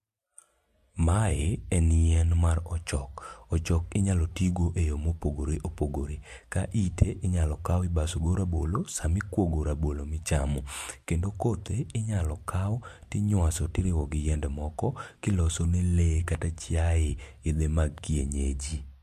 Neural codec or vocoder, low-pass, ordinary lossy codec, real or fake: none; 14.4 kHz; MP3, 64 kbps; real